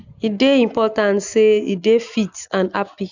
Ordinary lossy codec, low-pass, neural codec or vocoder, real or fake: none; 7.2 kHz; none; real